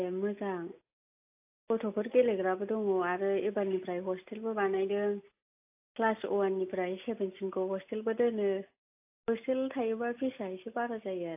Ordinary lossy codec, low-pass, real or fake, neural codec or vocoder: AAC, 32 kbps; 3.6 kHz; real; none